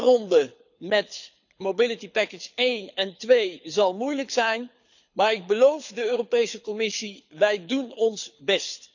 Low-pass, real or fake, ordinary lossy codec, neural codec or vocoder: 7.2 kHz; fake; none; codec, 24 kHz, 6 kbps, HILCodec